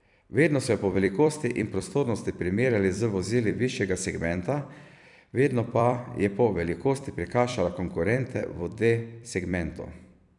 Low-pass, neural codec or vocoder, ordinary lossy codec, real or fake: 10.8 kHz; vocoder, 44.1 kHz, 128 mel bands every 256 samples, BigVGAN v2; none; fake